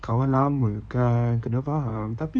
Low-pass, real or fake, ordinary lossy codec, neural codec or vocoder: 9.9 kHz; fake; none; codec, 16 kHz in and 24 kHz out, 2.2 kbps, FireRedTTS-2 codec